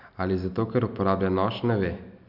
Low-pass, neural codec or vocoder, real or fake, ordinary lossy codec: 5.4 kHz; none; real; none